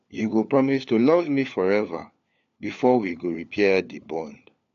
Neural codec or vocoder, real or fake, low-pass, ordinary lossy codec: codec, 16 kHz, 4 kbps, FunCodec, trained on LibriTTS, 50 frames a second; fake; 7.2 kHz; AAC, 64 kbps